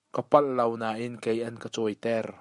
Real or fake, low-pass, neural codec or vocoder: real; 10.8 kHz; none